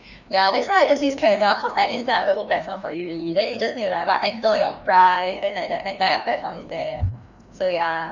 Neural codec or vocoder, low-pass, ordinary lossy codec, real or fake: codec, 16 kHz, 1 kbps, FreqCodec, larger model; 7.2 kHz; none; fake